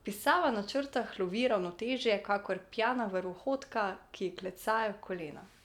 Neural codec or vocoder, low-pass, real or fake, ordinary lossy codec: none; 19.8 kHz; real; none